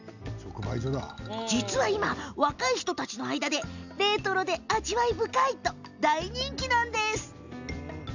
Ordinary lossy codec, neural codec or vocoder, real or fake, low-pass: none; none; real; 7.2 kHz